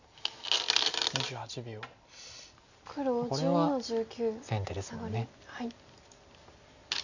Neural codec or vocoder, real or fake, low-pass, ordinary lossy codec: none; real; 7.2 kHz; none